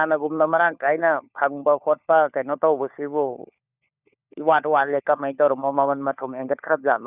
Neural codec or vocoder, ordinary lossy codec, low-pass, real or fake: codec, 16 kHz, 4 kbps, FunCodec, trained on LibriTTS, 50 frames a second; none; 3.6 kHz; fake